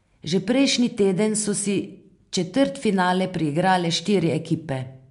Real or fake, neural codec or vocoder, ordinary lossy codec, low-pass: real; none; MP3, 64 kbps; 10.8 kHz